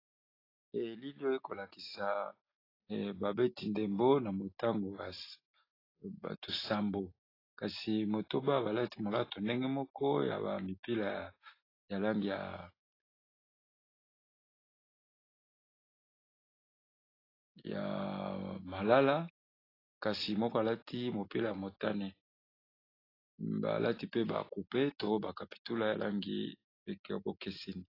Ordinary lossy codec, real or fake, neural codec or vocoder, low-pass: AAC, 24 kbps; real; none; 5.4 kHz